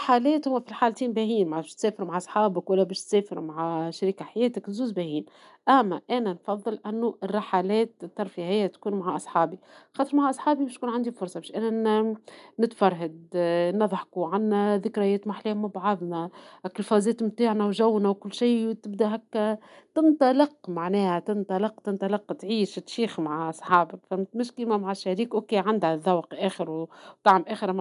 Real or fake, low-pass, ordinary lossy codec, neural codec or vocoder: real; 10.8 kHz; none; none